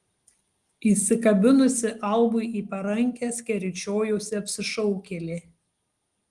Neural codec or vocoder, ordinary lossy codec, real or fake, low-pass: none; Opus, 24 kbps; real; 10.8 kHz